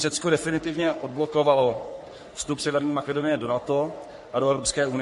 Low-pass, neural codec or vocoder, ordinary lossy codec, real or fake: 14.4 kHz; codec, 44.1 kHz, 3.4 kbps, Pupu-Codec; MP3, 48 kbps; fake